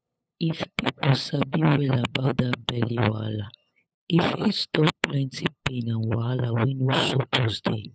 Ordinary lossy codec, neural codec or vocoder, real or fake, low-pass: none; codec, 16 kHz, 8 kbps, FunCodec, trained on LibriTTS, 25 frames a second; fake; none